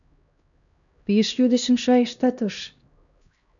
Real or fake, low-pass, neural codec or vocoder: fake; 7.2 kHz; codec, 16 kHz, 1 kbps, X-Codec, HuBERT features, trained on LibriSpeech